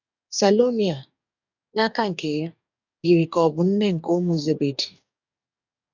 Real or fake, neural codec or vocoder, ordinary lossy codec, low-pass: fake; codec, 44.1 kHz, 2.6 kbps, DAC; none; 7.2 kHz